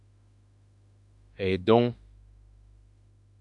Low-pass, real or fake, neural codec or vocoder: 10.8 kHz; fake; autoencoder, 48 kHz, 32 numbers a frame, DAC-VAE, trained on Japanese speech